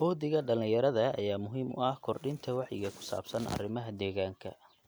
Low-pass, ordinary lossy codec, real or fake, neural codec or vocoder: none; none; real; none